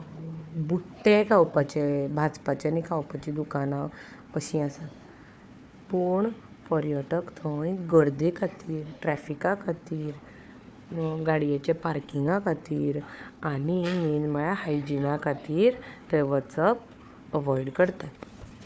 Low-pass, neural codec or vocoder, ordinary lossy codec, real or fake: none; codec, 16 kHz, 4 kbps, FunCodec, trained on Chinese and English, 50 frames a second; none; fake